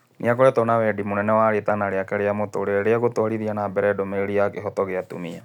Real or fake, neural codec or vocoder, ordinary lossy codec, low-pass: real; none; none; 19.8 kHz